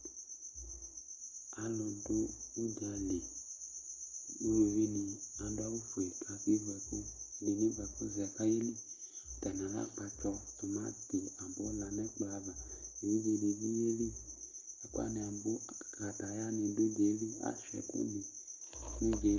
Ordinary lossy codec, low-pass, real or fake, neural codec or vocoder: Opus, 32 kbps; 7.2 kHz; real; none